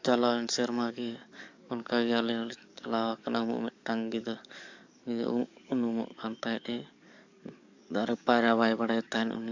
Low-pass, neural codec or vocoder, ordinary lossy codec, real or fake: 7.2 kHz; codec, 44.1 kHz, 7.8 kbps, DAC; AAC, 48 kbps; fake